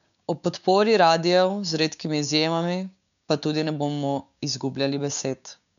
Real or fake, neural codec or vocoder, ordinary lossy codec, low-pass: fake; codec, 16 kHz, 6 kbps, DAC; none; 7.2 kHz